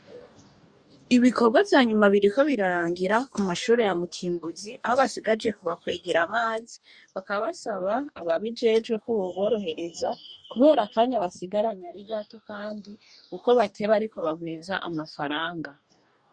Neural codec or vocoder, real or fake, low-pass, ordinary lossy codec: codec, 44.1 kHz, 2.6 kbps, DAC; fake; 9.9 kHz; Opus, 64 kbps